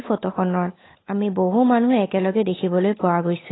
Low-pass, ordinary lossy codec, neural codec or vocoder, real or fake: 7.2 kHz; AAC, 16 kbps; codec, 16 kHz, 8 kbps, FunCodec, trained on Chinese and English, 25 frames a second; fake